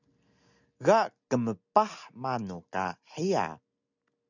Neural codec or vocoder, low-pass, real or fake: none; 7.2 kHz; real